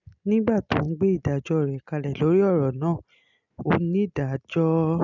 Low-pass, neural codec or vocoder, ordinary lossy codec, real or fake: 7.2 kHz; none; none; real